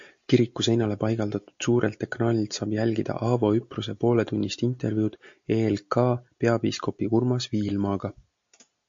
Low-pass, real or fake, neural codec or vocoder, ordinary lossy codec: 7.2 kHz; real; none; MP3, 48 kbps